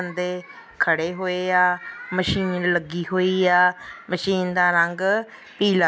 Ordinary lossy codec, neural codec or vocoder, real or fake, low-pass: none; none; real; none